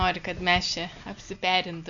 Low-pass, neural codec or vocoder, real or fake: 7.2 kHz; none; real